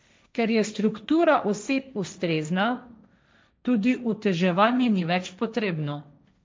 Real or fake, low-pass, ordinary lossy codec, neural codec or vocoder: fake; none; none; codec, 16 kHz, 1.1 kbps, Voila-Tokenizer